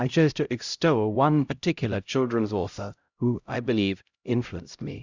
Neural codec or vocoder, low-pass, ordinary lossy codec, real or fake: codec, 16 kHz, 0.5 kbps, X-Codec, HuBERT features, trained on LibriSpeech; 7.2 kHz; Opus, 64 kbps; fake